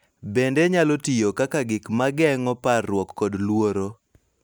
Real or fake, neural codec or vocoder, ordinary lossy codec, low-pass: real; none; none; none